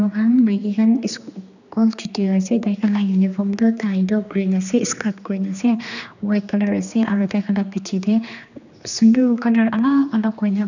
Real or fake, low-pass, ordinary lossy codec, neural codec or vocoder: fake; 7.2 kHz; none; codec, 16 kHz, 2 kbps, X-Codec, HuBERT features, trained on general audio